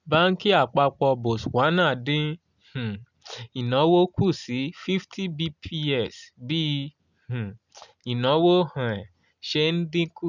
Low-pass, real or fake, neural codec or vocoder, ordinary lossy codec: 7.2 kHz; real; none; none